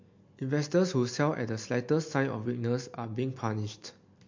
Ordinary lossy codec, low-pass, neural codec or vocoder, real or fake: MP3, 48 kbps; 7.2 kHz; none; real